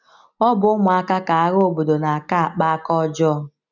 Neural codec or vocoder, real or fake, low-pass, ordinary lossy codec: none; real; 7.2 kHz; none